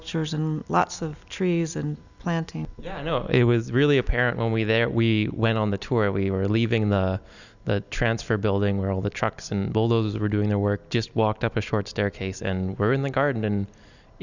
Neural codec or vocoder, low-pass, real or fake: none; 7.2 kHz; real